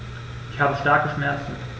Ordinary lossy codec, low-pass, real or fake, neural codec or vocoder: none; none; real; none